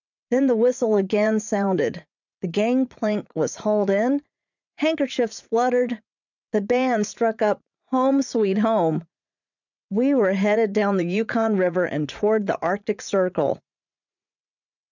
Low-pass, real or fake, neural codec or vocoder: 7.2 kHz; fake; vocoder, 44.1 kHz, 80 mel bands, Vocos